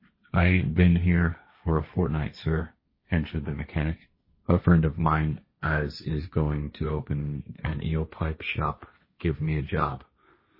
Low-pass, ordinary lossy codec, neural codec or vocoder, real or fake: 5.4 kHz; MP3, 24 kbps; codec, 16 kHz, 1.1 kbps, Voila-Tokenizer; fake